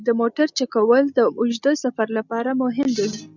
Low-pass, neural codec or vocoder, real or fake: 7.2 kHz; none; real